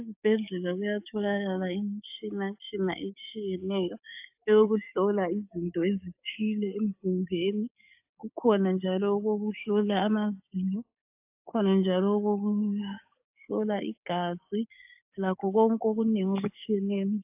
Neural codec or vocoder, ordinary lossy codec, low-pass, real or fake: codec, 16 kHz, 6 kbps, DAC; AAC, 32 kbps; 3.6 kHz; fake